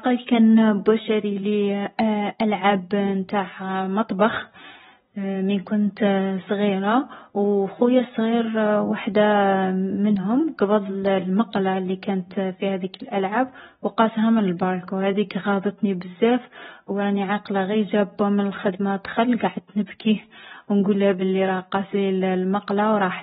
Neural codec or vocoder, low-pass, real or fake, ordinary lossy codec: none; 10.8 kHz; real; AAC, 16 kbps